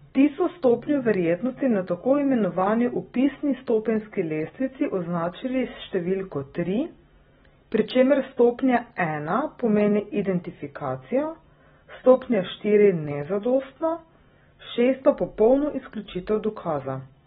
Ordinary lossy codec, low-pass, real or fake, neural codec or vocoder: AAC, 16 kbps; 19.8 kHz; real; none